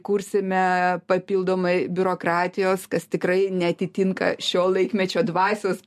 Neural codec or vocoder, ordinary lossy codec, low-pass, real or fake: none; MP3, 64 kbps; 14.4 kHz; real